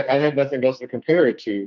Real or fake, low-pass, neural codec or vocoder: fake; 7.2 kHz; codec, 44.1 kHz, 2.6 kbps, SNAC